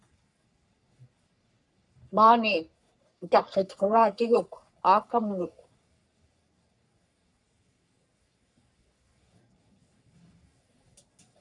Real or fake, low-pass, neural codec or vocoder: fake; 10.8 kHz; codec, 44.1 kHz, 3.4 kbps, Pupu-Codec